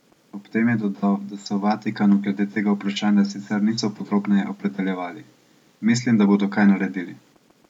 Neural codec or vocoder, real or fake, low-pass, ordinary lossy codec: none; real; 19.8 kHz; MP3, 96 kbps